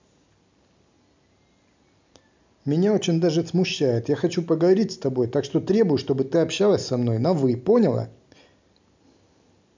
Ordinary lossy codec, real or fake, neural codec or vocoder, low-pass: MP3, 64 kbps; real; none; 7.2 kHz